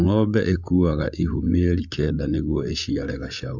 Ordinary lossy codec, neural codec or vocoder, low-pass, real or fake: MP3, 64 kbps; codec, 16 kHz, 8 kbps, FreqCodec, larger model; 7.2 kHz; fake